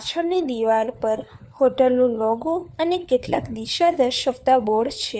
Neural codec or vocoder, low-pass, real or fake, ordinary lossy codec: codec, 16 kHz, 4 kbps, FunCodec, trained on LibriTTS, 50 frames a second; none; fake; none